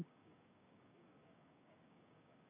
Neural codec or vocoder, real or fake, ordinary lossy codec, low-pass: codec, 16 kHz, 6 kbps, DAC; fake; none; 3.6 kHz